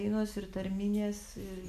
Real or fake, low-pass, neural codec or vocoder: real; 14.4 kHz; none